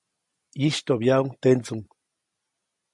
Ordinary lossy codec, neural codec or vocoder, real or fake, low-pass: MP3, 48 kbps; none; real; 10.8 kHz